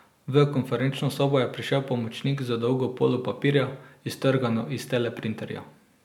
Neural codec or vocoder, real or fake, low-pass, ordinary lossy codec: none; real; 19.8 kHz; none